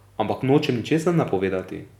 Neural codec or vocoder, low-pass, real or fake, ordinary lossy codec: none; 19.8 kHz; real; none